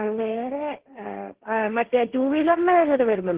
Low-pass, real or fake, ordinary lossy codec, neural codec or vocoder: 3.6 kHz; fake; Opus, 16 kbps; codec, 16 kHz, 1.1 kbps, Voila-Tokenizer